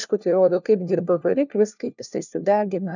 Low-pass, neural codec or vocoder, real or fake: 7.2 kHz; codec, 16 kHz, 1 kbps, FunCodec, trained on LibriTTS, 50 frames a second; fake